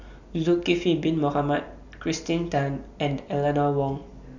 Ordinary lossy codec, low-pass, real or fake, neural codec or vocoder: none; 7.2 kHz; real; none